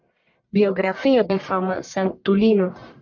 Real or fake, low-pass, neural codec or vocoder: fake; 7.2 kHz; codec, 44.1 kHz, 1.7 kbps, Pupu-Codec